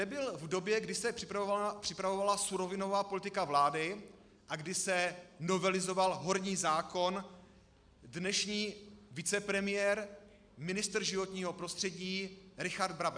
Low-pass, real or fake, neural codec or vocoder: 9.9 kHz; real; none